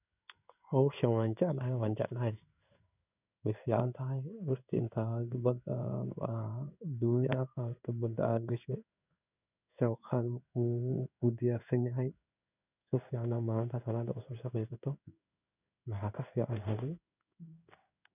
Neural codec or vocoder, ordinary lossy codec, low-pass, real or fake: codec, 16 kHz in and 24 kHz out, 1 kbps, XY-Tokenizer; none; 3.6 kHz; fake